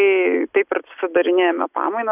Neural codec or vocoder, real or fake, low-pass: none; real; 3.6 kHz